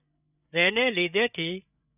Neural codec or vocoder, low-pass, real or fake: codec, 16 kHz, 8 kbps, FreqCodec, larger model; 3.6 kHz; fake